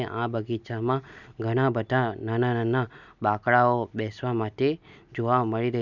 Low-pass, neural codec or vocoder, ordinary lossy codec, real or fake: 7.2 kHz; none; none; real